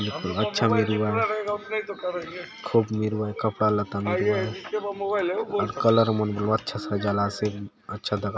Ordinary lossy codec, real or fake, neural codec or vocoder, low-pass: none; real; none; none